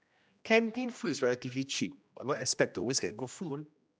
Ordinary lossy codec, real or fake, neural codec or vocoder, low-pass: none; fake; codec, 16 kHz, 1 kbps, X-Codec, HuBERT features, trained on general audio; none